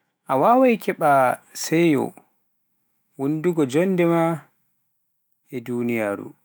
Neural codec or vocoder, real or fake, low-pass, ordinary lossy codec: autoencoder, 48 kHz, 128 numbers a frame, DAC-VAE, trained on Japanese speech; fake; none; none